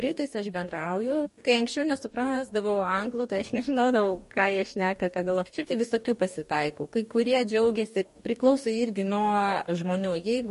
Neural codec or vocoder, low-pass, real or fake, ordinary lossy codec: codec, 44.1 kHz, 2.6 kbps, DAC; 14.4 kHz; fake; MP3, 48 kbps